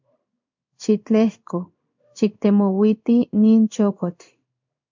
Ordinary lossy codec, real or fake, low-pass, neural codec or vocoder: MP3, 64 kbps; fake; 7.2 kHz; codec, 16 kHz in and 24 kHz out, 1 kbps, XY-Tokenizer